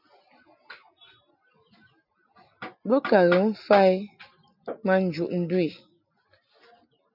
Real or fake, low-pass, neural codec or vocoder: real; 5.4 kHz; none